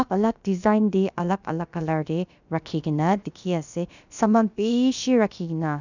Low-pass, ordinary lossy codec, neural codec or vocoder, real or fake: 7.2 kHz; none; codec, 16 kHz, about 1 kbps, DyCAST, with the encoder's durations; fake